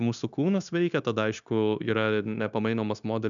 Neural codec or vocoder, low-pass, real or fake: codec, 16 kHz, 0.9 kbps, LongCat-Audio-Codec; 7.2 kHz; fake